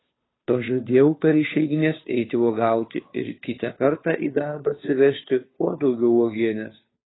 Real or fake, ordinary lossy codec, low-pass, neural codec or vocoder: fake; AAC, 16 kbps; 7.2 kHz; codec, 16 kHz, 2 kbps, FunCodec, trained on Chinese and English, 25 frames a second